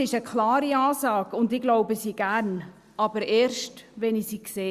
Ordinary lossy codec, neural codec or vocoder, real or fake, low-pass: Opus, 64 kbps; none; real; 14.4 kHz